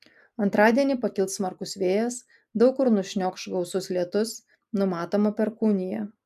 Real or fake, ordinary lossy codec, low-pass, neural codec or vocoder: real; AAC, 96 kbps; 14.4 kHz; none